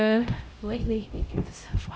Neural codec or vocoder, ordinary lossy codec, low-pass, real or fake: codec, 16 kHz, 0.5 kbps, X-Codec, HuBERT features, trained on LibriSpeech; none; none; fake